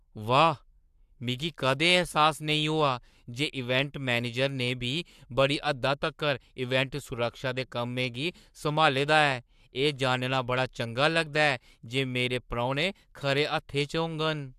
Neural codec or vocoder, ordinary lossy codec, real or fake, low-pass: codec, 44.1 kHz, 7.8 kbps, Pupu-Codec; AAC, 96 kbps; fake; 14.4 kHz